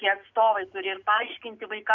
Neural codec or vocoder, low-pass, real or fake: none; 7.2 kHz; real